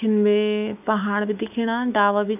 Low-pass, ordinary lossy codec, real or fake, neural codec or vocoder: 3.6 kHz; none; fake; autoencoder, 48 kHz, 128 numbers a frame, DAC-VAE, trained on Japanese speech